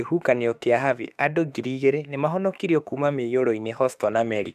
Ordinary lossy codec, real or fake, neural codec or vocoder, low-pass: AAC, 96 kbps; fake; autoencoder, 48 kHz, 32 numbers a frame, DAC-VAE, trained on Japanese speech; 14.4 kHz